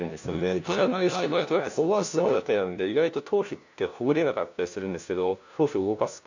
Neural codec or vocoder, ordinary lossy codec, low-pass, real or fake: codec, 16 kHz, 1 kbps, FunCodec, trained on LibriTTS, 50 frames a second; none; 7.2 kHz; fake